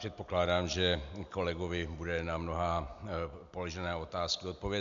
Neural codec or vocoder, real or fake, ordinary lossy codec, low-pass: none; real; AAC, 64 kbps; 7.2 kHz